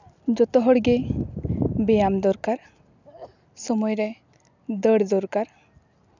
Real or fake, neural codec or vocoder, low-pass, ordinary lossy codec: real; none; 7.2 kHz; none